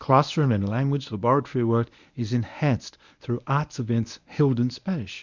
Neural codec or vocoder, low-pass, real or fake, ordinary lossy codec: codec, 24 kHz, 0.9 kbps, WavTokenizer, medium speech release version 1; 7.2 kHz; fake; Opus, 64 kbps